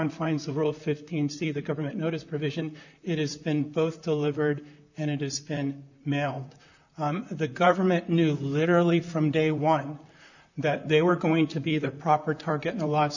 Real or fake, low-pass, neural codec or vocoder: fake; 7.2 kHz; vocoder, 44.1 kHz, 128 mel bands, Pupu-Vocoder